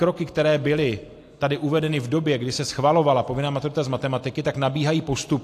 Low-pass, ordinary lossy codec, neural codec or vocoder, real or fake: 14.4 kHz; AAC, 64 kbps; none; real